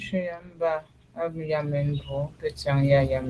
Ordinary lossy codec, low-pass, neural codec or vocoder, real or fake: Opus, 16 kbps; 10.8 kHz; none; real